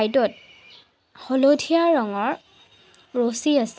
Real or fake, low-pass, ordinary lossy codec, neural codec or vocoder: real; none; none; none